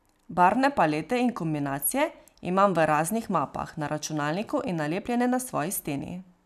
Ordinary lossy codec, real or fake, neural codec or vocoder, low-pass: none; real; none; 14.4 kHz